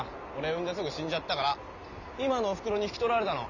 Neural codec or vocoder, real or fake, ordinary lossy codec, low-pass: none; real; none; 7.2 kHz